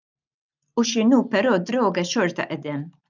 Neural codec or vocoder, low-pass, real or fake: none; 7.2 kHz; real